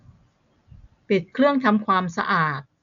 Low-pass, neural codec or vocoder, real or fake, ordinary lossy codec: 7.2 kHz; none; real; none